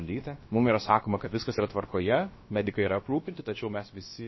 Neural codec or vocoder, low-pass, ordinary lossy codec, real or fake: codec, 16 kHz, about 1 kbps, DyCAST, with the encoder's durations; 7.2 kHz; MP3, 24 kbps; fake